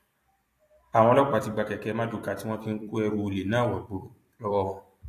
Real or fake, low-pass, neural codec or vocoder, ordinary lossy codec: fake; 14.4 kHz; vocoder, 44.1 kHz, 128 mel bands every 256 samples, BigVGAN v2; AAC, 64 kbps